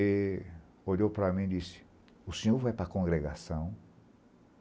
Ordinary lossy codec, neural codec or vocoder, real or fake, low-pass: none; none; real; none